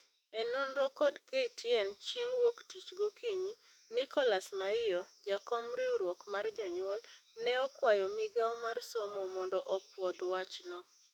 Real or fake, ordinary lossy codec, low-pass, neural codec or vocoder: fake; none; 19.8 kHz; autoencoder, 48 kHz, 32 numbers a frame, DAC-VAE, trained on Japanese speech